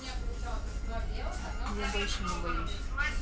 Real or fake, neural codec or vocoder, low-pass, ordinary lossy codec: real; none; none; none